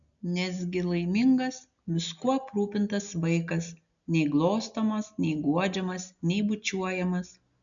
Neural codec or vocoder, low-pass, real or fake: none; 7.2 kHz; real